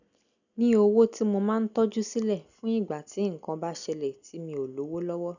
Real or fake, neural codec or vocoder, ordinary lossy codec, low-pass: real; none; none; 7.2 kHz